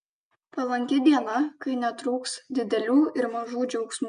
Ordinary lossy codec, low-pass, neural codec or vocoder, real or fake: MP3, 64 kbps; 9.9 kHz; none; real